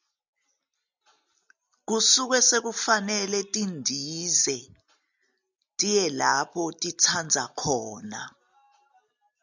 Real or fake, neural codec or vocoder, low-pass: real; none; 7.2 kHz